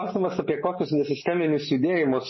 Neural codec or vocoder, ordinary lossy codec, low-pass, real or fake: none; MP3, 24 kbps; 7.2 kHz; real